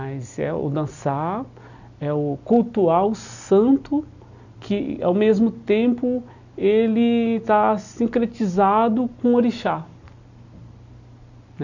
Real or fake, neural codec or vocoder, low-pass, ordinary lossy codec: real; none; 7.2 kHz; none